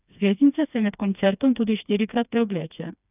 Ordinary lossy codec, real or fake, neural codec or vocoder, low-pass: none; fake; codec, 16 kHz, 2 kbps, FreqCodec, smaller model; 3.6 kHz